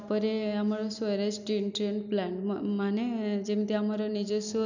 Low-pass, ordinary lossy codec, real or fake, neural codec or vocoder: 7.2 kHz; none; real; none